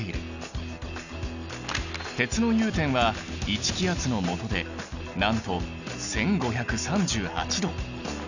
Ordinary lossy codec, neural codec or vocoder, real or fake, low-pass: none; none; real; 7.2 kHz